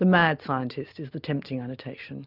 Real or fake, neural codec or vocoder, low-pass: real; none; 5.4 kHz